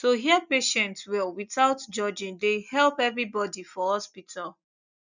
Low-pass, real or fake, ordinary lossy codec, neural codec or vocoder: 7.2 kHz; real; none; none